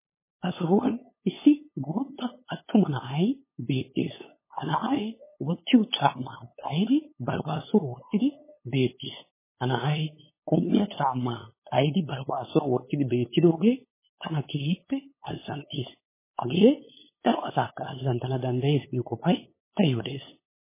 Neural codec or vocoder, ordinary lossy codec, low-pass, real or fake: codec, 16 kHz, 8 kbps, FunCodec, trained on LibriTTS, 25 frames a second; MP3, 16 kbps; 3.6 kHz; fake